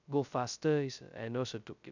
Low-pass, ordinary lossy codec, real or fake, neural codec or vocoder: 7.2 kHz; none; fake; codec, 16 kHz, 0.2 kbps, FocalCodec